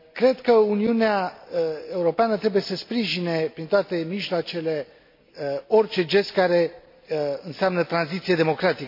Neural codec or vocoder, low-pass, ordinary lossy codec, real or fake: none; 5.4 kHz; MP3, 32 kbps; real